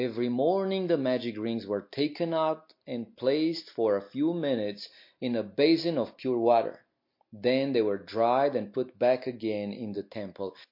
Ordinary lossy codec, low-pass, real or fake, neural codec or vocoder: MP3, 32 kbps; 5.4 kHz; real; none